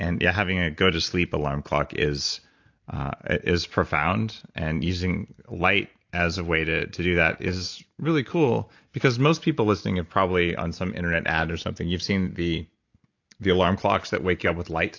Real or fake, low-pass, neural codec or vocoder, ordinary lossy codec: real; 7.2 kHz; none; AAC, 48 kbps